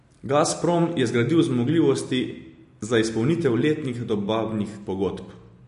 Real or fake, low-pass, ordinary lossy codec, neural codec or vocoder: real; 10.8 kHz; MP3, 48 kbps; none